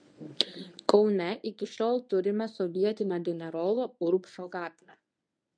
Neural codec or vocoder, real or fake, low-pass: codec, 24 kHz, 0.9 kbps, WavTokenizer, medium speech release version 1; fake; 9.9 kHz